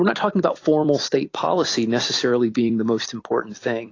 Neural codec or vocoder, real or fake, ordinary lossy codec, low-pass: vocoder, 44.1 kHz, 128 mel bands every 256 samples, BigVGAN v2; fake; AAC, 32 kbps; 7.2 kHz